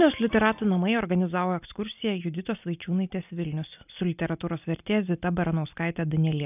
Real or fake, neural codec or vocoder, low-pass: real; none; 3.6 kHz